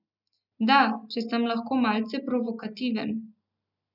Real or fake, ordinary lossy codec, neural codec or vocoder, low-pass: real; none; none; 5.4 kHz